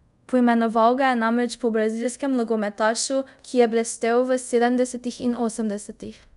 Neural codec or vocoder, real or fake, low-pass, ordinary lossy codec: codec, 24 kHz, 0.5 kbps, DualCodec; fake; 10.8 kHz; none